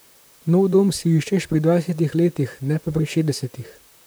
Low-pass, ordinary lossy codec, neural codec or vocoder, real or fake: none; none; vocoder, 44.1 kHz, 128 mel bands, Pupu-Vocoder; fake